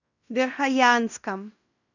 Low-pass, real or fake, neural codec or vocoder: 7.2 kHz; fake; codec, 16 kHz in and 24 kHz out, 0.9 kbps, LongCat-Audio-Codec, fine tuned four codebook decoder